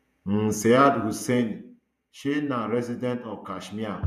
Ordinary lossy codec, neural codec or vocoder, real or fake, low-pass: none; vocoder, 48 kHz, 128 mel bands, Vocos; fake; 14.4 kHz